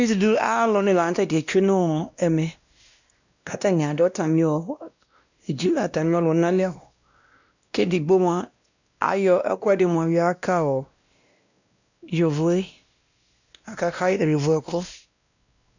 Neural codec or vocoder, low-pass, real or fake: codec, 16 kHz, 1 kbps, X-Codec, WavLM features, trained on Multilingual LibriSpeech; 7.2 kHz; fake